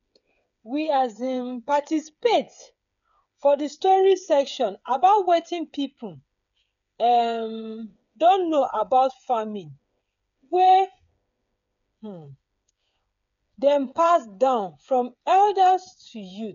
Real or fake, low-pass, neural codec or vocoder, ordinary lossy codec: fake; 7.2 kHz; codec, 16 kHz, 8 kbps, FreqCodec, smaller model; none